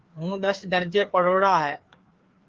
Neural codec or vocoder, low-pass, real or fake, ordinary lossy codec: codec, 16 kHz, 2 kbps, FreqCodec, larger model; 7.2 kHz; fake; Opus, 16 kbps